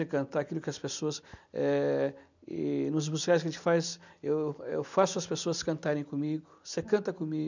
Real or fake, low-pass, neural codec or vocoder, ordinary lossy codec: real; 7.2 kHz; none; none